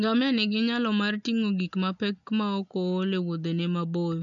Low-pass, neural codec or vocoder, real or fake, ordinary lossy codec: 7.2 kHz; none; real; none